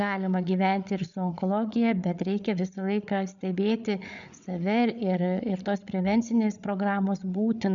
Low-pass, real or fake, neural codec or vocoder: 7.2 kHz; fake; codec, 16 kHz, 8 kbps, FreqCodec, larger model